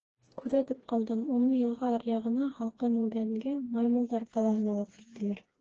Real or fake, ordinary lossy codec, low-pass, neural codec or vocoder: fake; Opus, 16 kbps; 7.2 kHz; codec, 16 kHz, 2 kbps, FreqCodec, smaller model